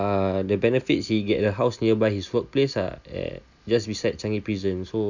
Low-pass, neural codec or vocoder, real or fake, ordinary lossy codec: 7.2 kHz; none; real; none